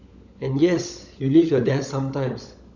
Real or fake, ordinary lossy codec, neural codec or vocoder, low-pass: fake; none; codec, 16 kHz, 16 kbps, FunCodec, trained on LibriTTS, 50 frames a second; 7.2 kHz